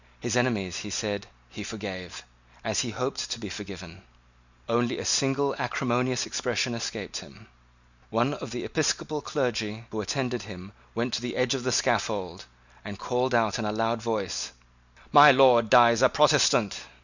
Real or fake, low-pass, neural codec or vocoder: real; 7.2 kHz; none